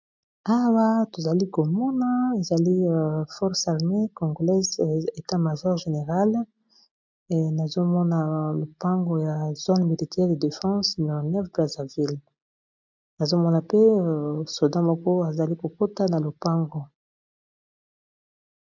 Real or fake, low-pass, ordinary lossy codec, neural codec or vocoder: real; 7.2 kHz; MP3, 64 kbps; none